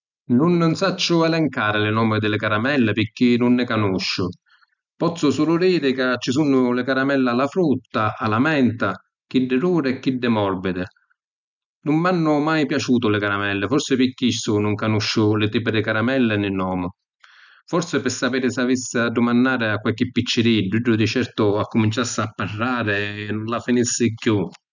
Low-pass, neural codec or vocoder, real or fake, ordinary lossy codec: 7.2 kHz; none; real; none